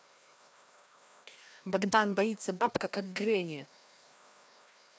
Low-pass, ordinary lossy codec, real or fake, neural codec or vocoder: none; none; fake; codec, 16 kHz, 1 kbps, FreqCodec, larger model